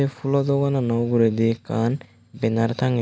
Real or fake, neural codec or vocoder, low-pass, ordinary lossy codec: real; none; none; none